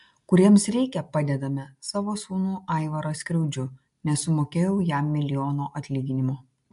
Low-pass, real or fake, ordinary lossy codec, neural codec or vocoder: 10.8 kHz; real; MP3, 64 kbps; none